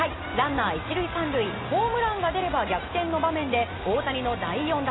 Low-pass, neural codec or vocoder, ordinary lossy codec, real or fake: 7.2 kHz; none; AAC, 16 kbps; real